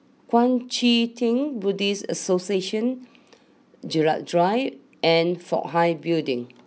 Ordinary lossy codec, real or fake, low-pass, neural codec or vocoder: none; real; none; none